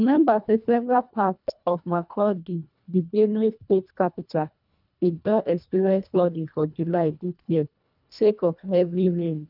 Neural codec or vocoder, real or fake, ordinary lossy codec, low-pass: codec, 24 kHz, 1.5 kbps, HILCodec; fake; none; 5.4 kHz